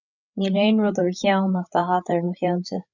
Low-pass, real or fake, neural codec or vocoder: 7.2 kHz; fake; codec, 16 kHz, 8 kbps, FreqCodec, larger model